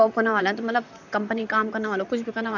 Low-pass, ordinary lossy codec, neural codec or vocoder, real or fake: 7.2 kHz; none; vocoder, 44.1 kHz, 128 mel bands, Pupu-Vocoder; fake